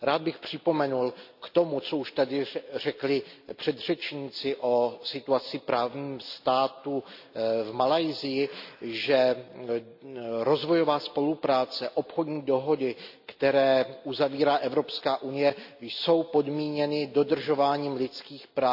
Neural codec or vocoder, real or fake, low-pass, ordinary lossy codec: none; real; 5.4 kHz; AAC, 48 kbps